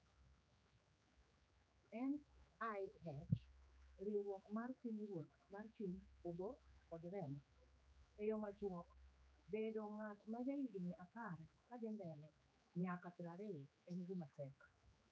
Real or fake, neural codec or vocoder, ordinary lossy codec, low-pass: fake; codec, 16 kHz, 4 kbps, X-Codec, HuBERT features, trained on general audio; none; none